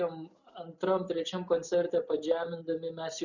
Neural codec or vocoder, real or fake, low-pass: none; real; 7.2 kHz